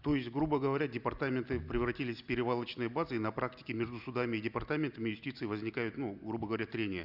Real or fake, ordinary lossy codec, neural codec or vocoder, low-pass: real; Opus, 64 kbps; none; 5.4 kHz